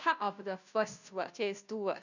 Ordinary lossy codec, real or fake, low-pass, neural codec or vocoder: none; fake; 7.2 kHz; codec, 16 kHz, 0.5 kbps, FunCodec, trained on Chinese and English, 25 frames a second